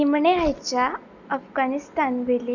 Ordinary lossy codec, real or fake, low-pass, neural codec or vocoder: none; real; 7.2 kHz; none